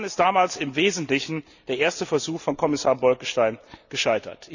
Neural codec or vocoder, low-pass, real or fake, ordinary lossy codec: none; 7.2 kHz; real; none